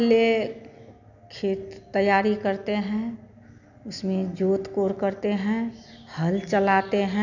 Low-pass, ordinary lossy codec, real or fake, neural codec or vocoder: 7.2 kHz; none; real; none